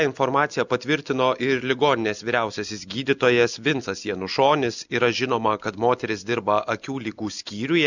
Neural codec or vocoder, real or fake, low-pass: none; real; 7.2 kHz